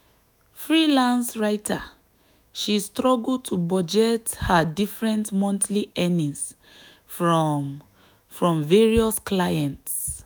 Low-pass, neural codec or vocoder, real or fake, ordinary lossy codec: none; autoencoder, 48 kHz, 128 numbers a frame, DAC-VAE, trained on Japanese speech; fake; none